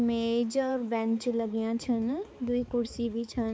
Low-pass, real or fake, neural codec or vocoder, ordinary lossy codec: none; fake; codec, 16 kHz, 4 kbps, X-Codec, HuBERT features, trained on balanced general audio; none